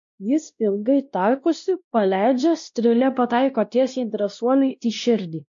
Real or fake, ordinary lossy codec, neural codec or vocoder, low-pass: fake; MP3, 48 kbps; codec, 16 kHz, 1 kbps, X-Codec, WavLM features, trained on Multilingual LibriSpeech; 7.2 kHz